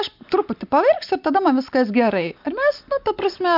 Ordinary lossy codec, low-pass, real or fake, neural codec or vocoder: MP3, 48 kbps; 5.4 kHz; real; none